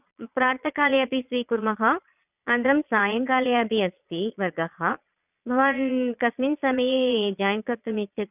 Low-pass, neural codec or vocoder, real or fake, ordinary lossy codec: 3.6 kHz; vocoder, 22.05 kHz, 80 mel bands, Vocos; fake; none